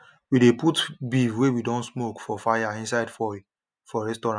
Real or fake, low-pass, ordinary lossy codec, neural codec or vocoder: real; 9.9 kHz; none; none